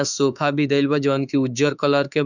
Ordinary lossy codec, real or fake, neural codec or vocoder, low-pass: none; fake; autoencoder, 48 kHz, 32 numbers a frame, DAC-VAE, trained on Japanese speech; 7.2 kHz